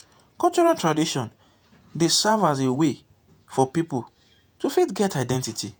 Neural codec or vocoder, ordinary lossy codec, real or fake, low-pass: vocoder, 48 kHz, 128 mel bands, Vocos; none; fake; none